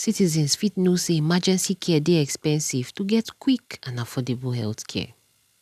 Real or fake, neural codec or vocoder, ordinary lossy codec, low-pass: real; none; none; 14.4 kHz